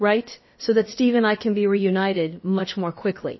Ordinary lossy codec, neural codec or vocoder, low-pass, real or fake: MP3, 24 kbps; codec, 16 kHz, about 1 kbps, DyCAST, with the encoder's durations; 7.2 kHz; fake